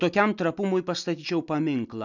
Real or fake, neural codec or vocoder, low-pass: real; none; 7.2 kHz